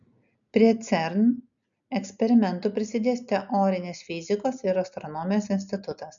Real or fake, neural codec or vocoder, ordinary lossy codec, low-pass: real; none; MP3, 96 kbps; 7.2 kHz